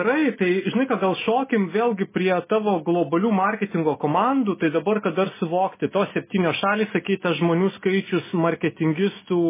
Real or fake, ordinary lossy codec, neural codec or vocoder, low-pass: real; MP3, 16 kbps; none; 3.6 kHz